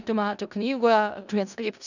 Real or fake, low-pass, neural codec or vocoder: fake; 7.2 kHz; codec, 16 kHz in and 24 kHz out, 0.4 kbps, LongCat-Audio-Codec, four codebook decoder